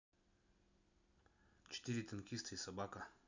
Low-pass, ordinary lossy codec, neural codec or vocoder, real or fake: 7.2 kHz; none; none; real